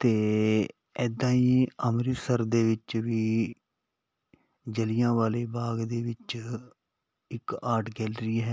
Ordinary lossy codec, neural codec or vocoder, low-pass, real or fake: none; none; none; real